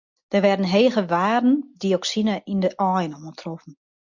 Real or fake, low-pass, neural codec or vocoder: real; 7.2 kHz; none